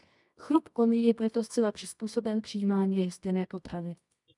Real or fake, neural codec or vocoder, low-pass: fake; codec, 24 kHz, 0.9 kbps, WavTokenizer, medium music audio release; 10.8 kHz